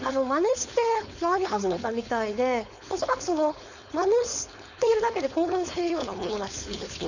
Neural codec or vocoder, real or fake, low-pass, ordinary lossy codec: codec, 16 kHz, 4.8 kbps, FACodec; fake; 7.2 kHz; none